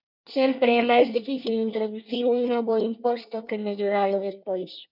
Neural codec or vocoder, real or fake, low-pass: codec, 24 kHz, 1 kbps, SNAC; fake; 5.4 kHz